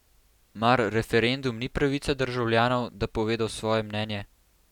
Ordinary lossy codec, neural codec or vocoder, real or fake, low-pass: none; none; real; 19.8 kHz